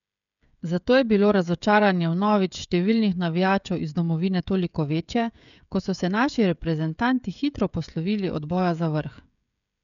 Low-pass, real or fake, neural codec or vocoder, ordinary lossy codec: 7.2 kHz; fake; codec, 16 kHz, 16 kbps, FreqCodec, smaller model; none